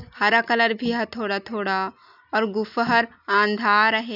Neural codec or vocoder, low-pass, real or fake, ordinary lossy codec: none; 5.4 kHz; real; none